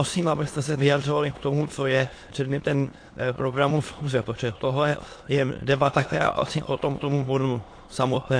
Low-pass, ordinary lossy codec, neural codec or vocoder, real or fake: 9.9 kHz; AAC, 48 kbps; autoencoder, 22.05 kHz, a latent of 192 numbers a frame, VITS, trained on many speakers; fake